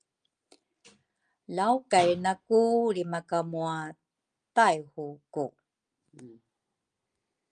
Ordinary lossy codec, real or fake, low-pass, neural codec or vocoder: Opus, 32 kbps; real; 9.9 kHz; none